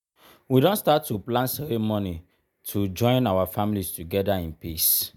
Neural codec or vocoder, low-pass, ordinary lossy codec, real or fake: none; none; none; real